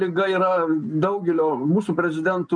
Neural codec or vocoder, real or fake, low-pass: none; real; 9.9 kHz